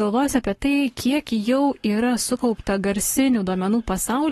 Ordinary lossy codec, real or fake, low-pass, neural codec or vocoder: AAC, 32 kbps; fake; 19.8 kHz; codec, 44.1 kHz, 7.8 kbps, Pupu-Codec